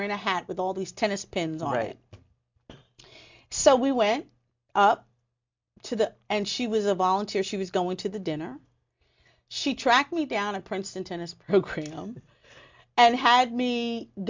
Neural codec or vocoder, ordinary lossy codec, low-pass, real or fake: none; MP3, 64 kbps; 7.2 kHz; real